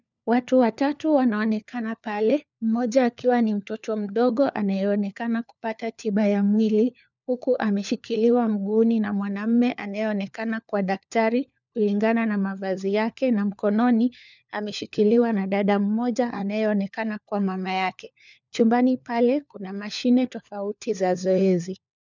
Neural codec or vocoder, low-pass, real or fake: codec, 16 kHz, 4 kbps, FunCodec, trained on LibriTTS, 50 frames a second; 7.2 kHz; fake